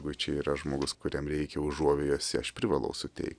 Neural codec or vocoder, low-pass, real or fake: none; 9.9 kHz; real